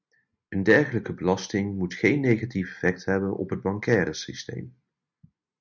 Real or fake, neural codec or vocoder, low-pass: real; none; 7.2 kHz